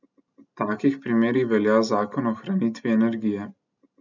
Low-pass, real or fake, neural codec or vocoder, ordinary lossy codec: 7.2 kHz; real; none; none